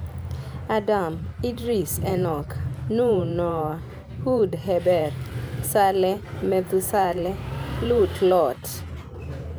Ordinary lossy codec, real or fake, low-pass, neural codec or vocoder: none; fake; none; vocoder, 44.1 kHz, 128 mel bands every 512 samples, BigVGAN v2